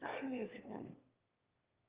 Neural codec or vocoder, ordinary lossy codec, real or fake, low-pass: autoencoder, 22.05 kHz, a latent of 192 numbers a frame, VITS, trained on one speaker; Opus, 32 kbps; fake; 3.6 kHz